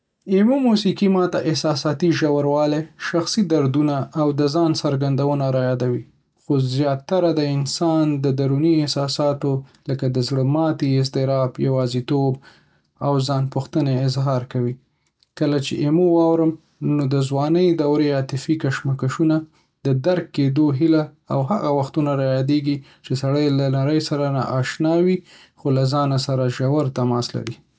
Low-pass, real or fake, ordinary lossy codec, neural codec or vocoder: none; real; none; none